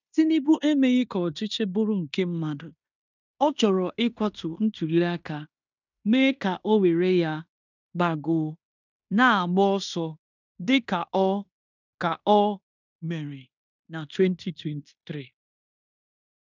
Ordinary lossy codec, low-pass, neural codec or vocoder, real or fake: none; 7.2 kHz; codec, 16 kHz in and 24 kHz out, 0.9 kbps, LongCat-Audio-Codec, fine tuned four codebook decoder; fake